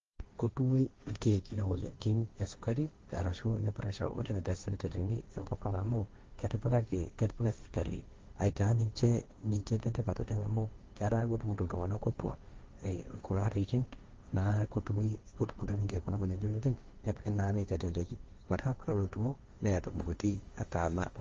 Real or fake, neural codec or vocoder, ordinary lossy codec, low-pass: fake; codec, 16 kHz, 1.1 kbps, Voila-Tokenizer; Opus, 32 kbps; 7.2 kHz